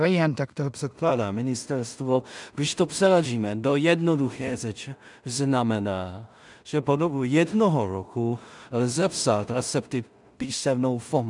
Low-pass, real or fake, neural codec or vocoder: 10.8 kHz; fake; codec, 16 kHz in and 24 kHz out, 0.4 kbps, LongCat-Audio-Codec, two codebook decoder